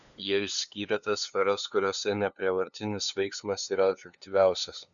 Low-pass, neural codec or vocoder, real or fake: 7.2 kHz; codec, 16 kHz, 2 kbps, FunCodec, trained on LibriTTS, 25 frames a second; fake